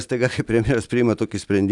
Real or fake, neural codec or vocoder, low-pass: real; none; 10.8 kHz